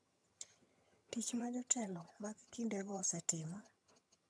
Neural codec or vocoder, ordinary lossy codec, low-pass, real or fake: vocoder, 22.05 kHz, 80 mel bands, HiFi-GAN; none; none; fake